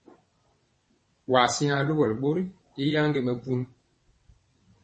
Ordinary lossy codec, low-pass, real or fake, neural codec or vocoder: MP3, 32 kbps; 9.9 kHz; fake; vocoder, 22.05 kHz, 80 mel bands, WaveNeXt